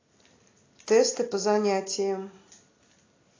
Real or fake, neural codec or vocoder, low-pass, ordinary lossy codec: real; none; 7.2 kHz; AAC, 32 kbps